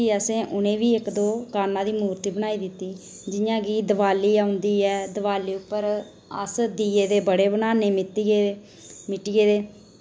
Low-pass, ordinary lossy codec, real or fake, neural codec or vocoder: none; none; real; none